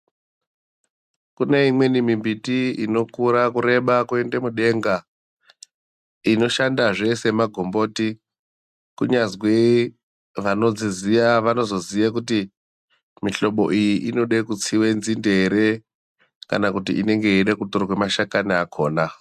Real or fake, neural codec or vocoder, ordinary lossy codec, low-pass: real; none; MP3, 96 kbps; 14.4 kHz